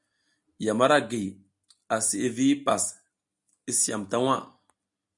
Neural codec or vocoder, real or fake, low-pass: none; real; 10.8 kHz